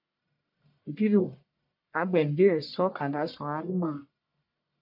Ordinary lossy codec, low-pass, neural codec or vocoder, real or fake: MP3, 48 kbps; 5.4 kHz; codec, 44.1 kHz, 1.7 kbps, Pupu-Codec; fake